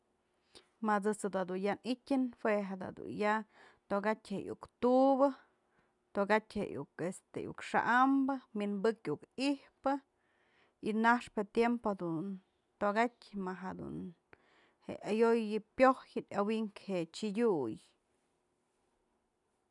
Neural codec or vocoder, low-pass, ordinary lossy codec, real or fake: none; 10.8 kHz; none; real